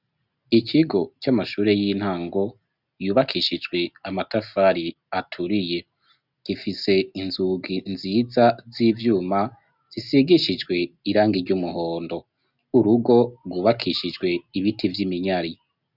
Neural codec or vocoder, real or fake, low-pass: none; real; 5.4 kHz